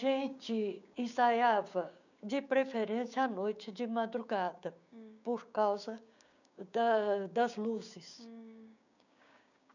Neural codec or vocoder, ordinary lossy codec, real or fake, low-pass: codec, 16 kHz, 6 kbps, DAC; none; fake; 7.2 kHz